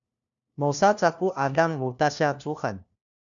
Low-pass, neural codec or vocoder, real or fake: 7.2 kHz; codec, 16 kHz, 1 kbps, FunCodec, trained on LibriTTS, 50 frames a second; fake